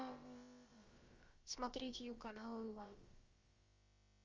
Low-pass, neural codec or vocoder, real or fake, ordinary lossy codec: 7.2 kHz; codec, 16 kHz, about 1 kbps, DyCAST, with the encoder's durations; fake; Opus, 32 kbps